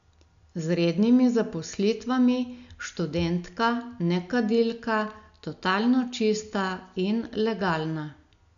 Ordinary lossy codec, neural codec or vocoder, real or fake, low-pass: none; none; real; 7.2 kHz